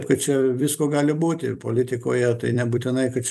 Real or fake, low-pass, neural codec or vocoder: fake; 14.4 kHz; vocoder, 44.1 kHz, 128 mel bands every 256 samples, BigVGAN v2